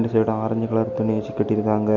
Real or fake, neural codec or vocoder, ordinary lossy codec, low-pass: real; none; none; 7.2 kHz